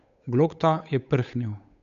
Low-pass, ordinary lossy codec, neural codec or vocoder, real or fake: 7.2 kHz; MP3, 96 kbps; codec, 16 kHz, 8 kbps, FunCodec, trained on Chinese and English, 25 frames a second; fake